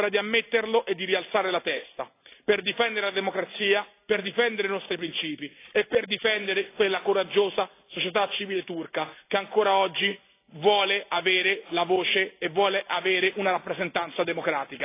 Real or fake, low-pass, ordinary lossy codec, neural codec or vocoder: real; 3.6 kHz; AAC, 24 kbps; none